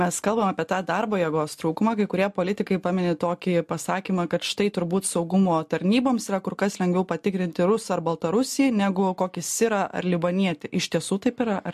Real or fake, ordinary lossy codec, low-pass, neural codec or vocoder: real; MP3, 64 kbps; 14.4 kHz; none